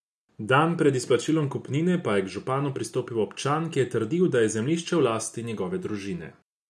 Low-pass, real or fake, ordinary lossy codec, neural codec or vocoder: none; real; none; none